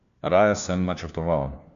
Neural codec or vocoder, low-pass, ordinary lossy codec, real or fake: codec, 16 kHz, 1 kbps, FunCodec, trained on LibriTTS, 50 frames a second; 7.2 kHz; none; fake